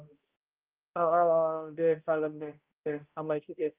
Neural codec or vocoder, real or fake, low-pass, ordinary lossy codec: codec, 16 kHz, 0.5 kbps, X-Codec, HuBERT features, trained on balanced general audio; fake; 3.6 kHz; Opus, 32 kbps